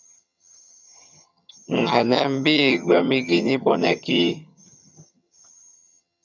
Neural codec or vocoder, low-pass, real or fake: vocoder, 22.05 kHz, 80 mel bands, HiFi-GAN; 7.2 kHz; fake